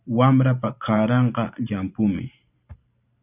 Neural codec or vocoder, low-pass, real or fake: vocoder, 44.1 kHz, 128 mel bands every 512 samples, BigVGAN v2; 3.6 kHz; fake